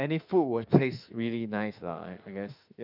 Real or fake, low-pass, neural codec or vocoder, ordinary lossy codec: fake; 5.4 kHz; autoencoder, 48 kHz, 32 numbers a frame, DAC-VAE, trained on Japanese speech; none